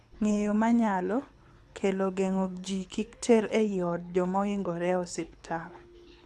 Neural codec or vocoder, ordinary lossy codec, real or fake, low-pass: codec, 24 kHz, 6 kbps, HILCodec; none; fake; none